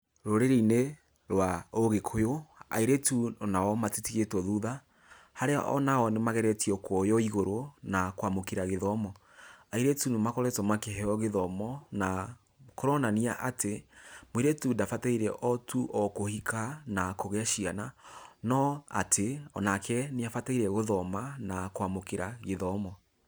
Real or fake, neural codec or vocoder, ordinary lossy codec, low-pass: real; none; none; none